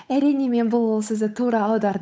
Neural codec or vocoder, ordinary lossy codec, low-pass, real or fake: codec, 16 kHz, 8 kbps, FunCodec, trained on Chinese and English, 25 frames a second; none; none; fake